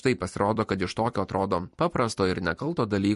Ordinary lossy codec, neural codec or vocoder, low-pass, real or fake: MP3, 48 kbps; vocoder, 44.1 kHz, 128 mel bands every 256 samples, BigVGAN v2; 14.4 kHz; fake